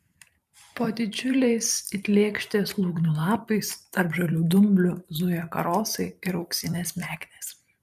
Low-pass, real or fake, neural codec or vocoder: 14.4 kHz; fake; vocoder, 44.1 kHz, 128 mel bands every 512 samples, BigVGAN v2